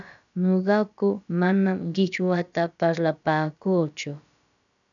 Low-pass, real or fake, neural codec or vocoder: 7.2 kHz; fake; codec, 16 kHz, about 1 kbps, DyCAST, with the encoder's durations